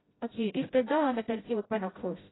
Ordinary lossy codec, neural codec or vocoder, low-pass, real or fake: AAC, 16 kbps; codec, 16 kHz, 0.5 kbps, FreqCodec, smaller model; 7.2 kHz; fake